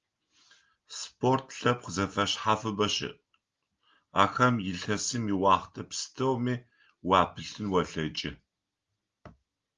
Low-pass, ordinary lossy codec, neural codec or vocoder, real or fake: 7.2 kHz; Opus, 32 kbps; none; real